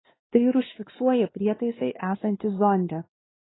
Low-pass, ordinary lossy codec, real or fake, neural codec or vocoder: 7.2 kHz; AAC, 16 kbps; fake; codec, 16 kHz, 2 kbps, X-Codec, WavLM features, trained on Multilingual LibriSpeech